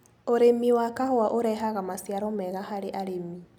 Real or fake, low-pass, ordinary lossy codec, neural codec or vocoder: real; 19.8 kHz; none; none